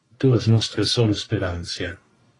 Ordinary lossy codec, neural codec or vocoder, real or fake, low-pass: AAC, 32 kbps; codec, 44.1 kHz, 1.7 kbps, Pupu-Codec; fake; 10.8 kHz